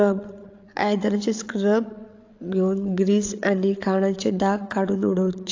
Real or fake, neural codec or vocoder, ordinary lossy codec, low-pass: fake; codec, 16 kHz, 8 kbps, FreqCodec, larger model; AAC, 48 kbps; 7.2 kHz